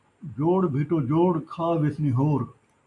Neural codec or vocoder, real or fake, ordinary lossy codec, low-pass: none; real; AAC, 64 kbps; 10.8 kHz